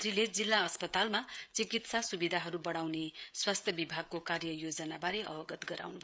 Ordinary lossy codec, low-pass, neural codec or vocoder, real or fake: none; none; codec, 16 kHz, 16 kbps, FreqCodec, smaller model; fake